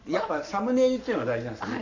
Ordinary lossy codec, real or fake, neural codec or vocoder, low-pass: none; fake; codec, 44.1 kHz, 7.8 kbps, Pupu-Codec; 7.2 kHz